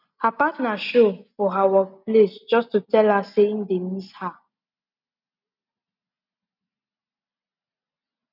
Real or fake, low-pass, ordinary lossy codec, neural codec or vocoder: real; 5.4 kHz; none; none